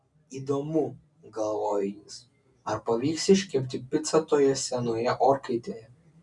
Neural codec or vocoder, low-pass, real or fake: none; 10.8 kHz; real